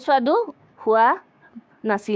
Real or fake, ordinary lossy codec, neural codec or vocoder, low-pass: fake; none; codec, 16 kHz, 6 kbps, DAC; none